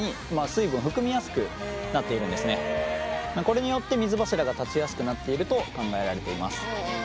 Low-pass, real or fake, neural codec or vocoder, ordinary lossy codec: none; real; none; none